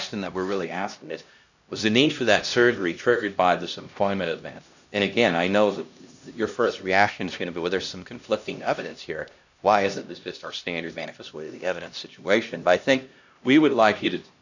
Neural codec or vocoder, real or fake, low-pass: codec, 16 kHz, 1 kbps, X-Codec, HuBERT features, trained on LibriSpeech; fake; 7.2 kHz